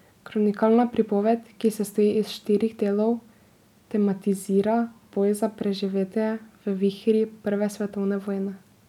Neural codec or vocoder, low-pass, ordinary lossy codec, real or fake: none; 19.8 kHz; none; real